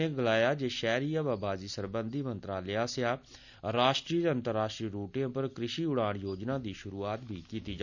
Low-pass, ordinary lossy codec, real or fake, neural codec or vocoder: 7.2 kHz; none; real; none